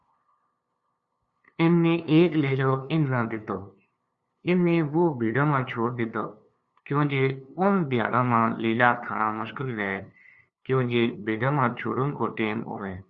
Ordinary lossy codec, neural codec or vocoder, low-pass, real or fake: Opus, 64 kbps; codec, 16 kHz, 2 kbps, FunCodec, trained on LibriTTS, 25 frames a second; 7.2 kHz; fake